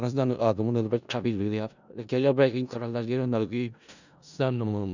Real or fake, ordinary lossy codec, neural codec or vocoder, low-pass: fake; none; codec, 16 kHz in and 24 kHz out, 0.4 kbps, LongCat-Audio-Codec, four codebook decoder; 7.2 kHz